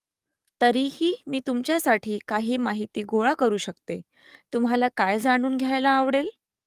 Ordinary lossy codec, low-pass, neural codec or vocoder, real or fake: Opus, 32 kbps; 14.4 kHz; codec, 44.1 kHz, 7.8 kbps, DAC; fake